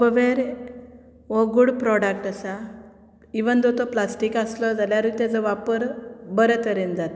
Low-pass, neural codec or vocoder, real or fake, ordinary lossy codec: none; none; real; none